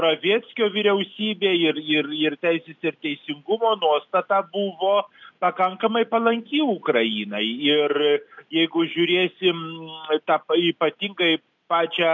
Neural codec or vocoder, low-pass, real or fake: none; 7.2 kHz; real